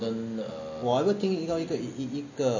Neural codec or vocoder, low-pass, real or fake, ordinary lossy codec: none; 7.2 kHz; real; none